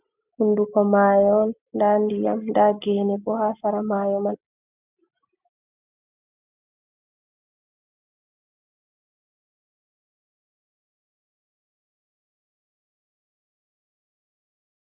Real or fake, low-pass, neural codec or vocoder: real; 3.6 kHz; none